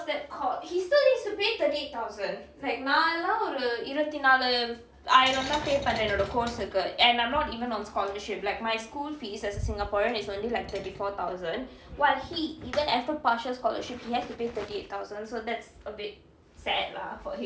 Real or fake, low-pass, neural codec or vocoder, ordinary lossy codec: real; none; none; none